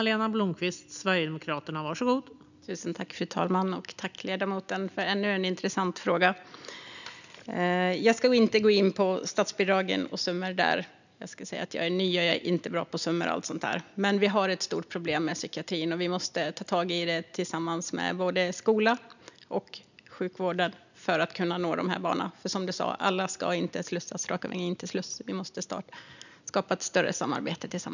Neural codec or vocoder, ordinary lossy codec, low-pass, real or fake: none; none; 7.2 kHz; real